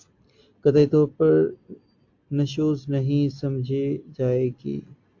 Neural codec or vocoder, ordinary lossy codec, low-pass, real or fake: none; AAC, 48 kbps; 7.2 kHz; real